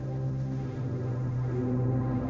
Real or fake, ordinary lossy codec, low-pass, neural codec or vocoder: fake; none; none; codec, 16 kHz, 1.1 kbps, Voila-Tokenizer